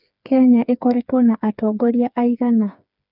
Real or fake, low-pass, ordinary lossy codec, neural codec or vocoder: fake; 5.4 kHz; none; codec, 16 kHz, 4 kbps, FreqCodec, smaller model